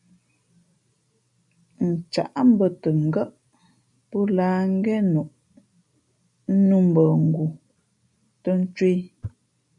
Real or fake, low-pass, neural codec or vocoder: real; 10.8 kHz; none